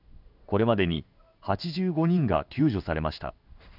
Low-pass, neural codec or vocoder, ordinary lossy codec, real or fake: 5.4 kHz; codec, 16 kHz in and 24 kHz out, 1 kbps, XY-Tokenizer; none; fake